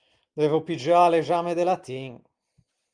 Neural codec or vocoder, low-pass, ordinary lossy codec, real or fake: none; 9.9 kHz; Opus, 32 kbps; real